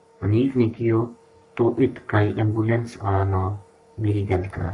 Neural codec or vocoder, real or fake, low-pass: codec, 44.1 kHz, 3.4 kbps, Pupu-Codec; fake; 10.8 kHz